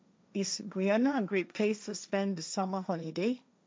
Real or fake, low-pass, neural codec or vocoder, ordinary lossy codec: fake; none; codec, 16 kHz, 1.1 kbps, Voila-Tokenizer; none